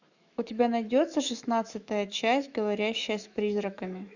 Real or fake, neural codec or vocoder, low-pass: fake; autoencoder, 48 kHz, 128 numbers a frame, DAC-VAE, trained on Japanese speech; 7.2 kHz